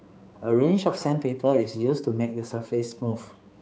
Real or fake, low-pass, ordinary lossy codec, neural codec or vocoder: fake; none; none; codec, 16 kHz, 4 kbps, X-Codec, HuBERT features, trained on balanced general audio